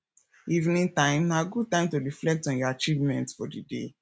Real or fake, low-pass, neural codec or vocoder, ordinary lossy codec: real; none; none; none